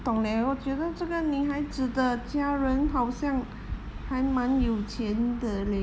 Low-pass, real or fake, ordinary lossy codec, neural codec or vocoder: none; real; none; none